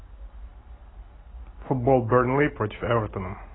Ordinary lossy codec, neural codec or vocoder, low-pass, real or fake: AAC, 16 kbps; none; 7.2 kHz; real